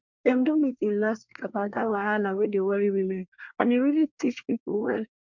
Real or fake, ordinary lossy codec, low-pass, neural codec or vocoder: fake; MP3, 64 kbps; 7.2 kHz; codec, 24 kHz, 1 kbps, SNAC